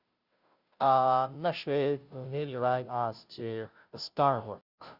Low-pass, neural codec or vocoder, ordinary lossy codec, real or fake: 5.4 kHz; codec, 16 kHz, 0.5 kbps, FunCodec, trained on Chinese and English, 25 frames a second; Opus, 64 kbps; fake